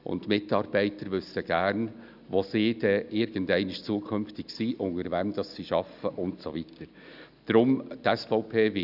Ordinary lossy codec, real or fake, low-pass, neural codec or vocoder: none; real; 5.4 kHz; none